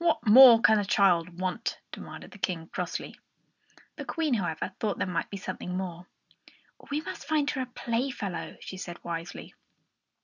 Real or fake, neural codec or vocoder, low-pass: real; none; 7.2 kHz